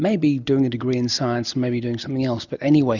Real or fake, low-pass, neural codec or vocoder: real; 7.2 kHz; none